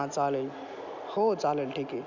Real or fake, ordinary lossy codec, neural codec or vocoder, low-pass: real; none; none; 7.2 kHz